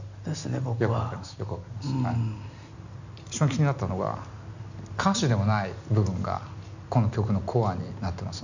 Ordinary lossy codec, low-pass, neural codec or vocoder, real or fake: none; 7.2 kHz; none; real